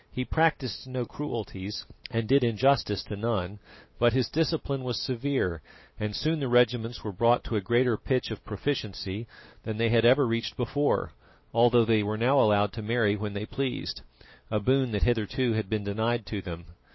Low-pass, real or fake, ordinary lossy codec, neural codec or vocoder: 7.2 kHz; real; MP3, 24 kbps; none